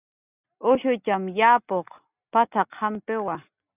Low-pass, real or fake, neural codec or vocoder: 3.6 kHz; real; none